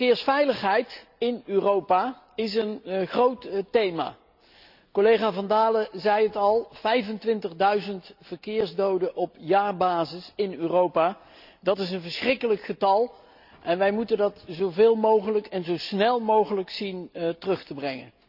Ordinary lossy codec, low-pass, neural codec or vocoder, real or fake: none; 5.4 kHz; none; real